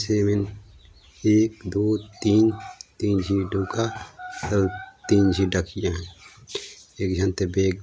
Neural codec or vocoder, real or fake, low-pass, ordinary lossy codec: none; real; none; none